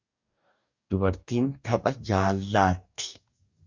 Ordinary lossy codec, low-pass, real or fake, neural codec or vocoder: Opus, 64 kbps; 7.2 kHz; fake; codec, 44.1 kHz, 2.6 kbps, DAC